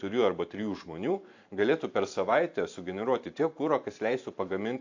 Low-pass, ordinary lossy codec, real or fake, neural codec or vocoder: 7.2 kHz; AAC, 48 kbps; fake; vocoder, 44.1 kHz, 128 mel bands every 512 samples, BigVGAN v2